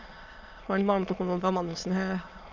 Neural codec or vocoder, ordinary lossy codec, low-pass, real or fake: autoencoder, 22.05 kHz, a latent of 192 numbers a frame, VITS, trained on many speakers; none; 7.2 kHz; fake